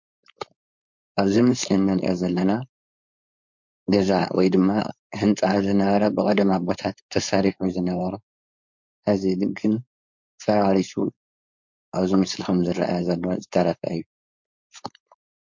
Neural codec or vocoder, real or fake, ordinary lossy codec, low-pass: codec, 16 kHz, 4.8 kbps, FACodec; fake; MP3, 48 kbps; 7.2 kHz